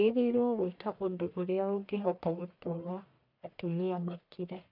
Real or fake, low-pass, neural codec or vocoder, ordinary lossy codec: fake; 5.4 kHz; codec, 44.1 kHz, 1.7 kbps, Pupu-Codec; none